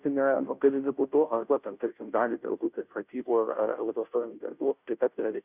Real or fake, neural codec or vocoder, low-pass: fake; codec, 16 kHz, 0.5 kbps, FunCodec, trained on Chinese and English, 25 frames a second; 3.6 kHz